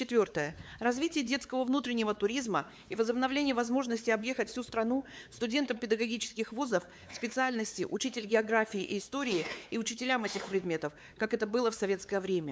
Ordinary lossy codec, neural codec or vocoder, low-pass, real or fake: none; codec, 16 kHz, 4 kbps, X-Codec, WavLM features, trained on Multilingual LibriSpeech; none; fake